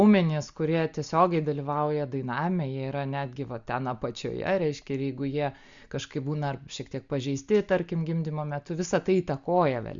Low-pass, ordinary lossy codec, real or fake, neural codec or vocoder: 7.2 kHz; Opus, 64 kbps; real; none